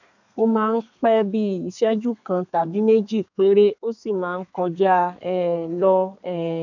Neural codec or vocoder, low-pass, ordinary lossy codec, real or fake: codec, 32 kHz, 1.9 kbps, SNAC; 7.2 kHz; none; fake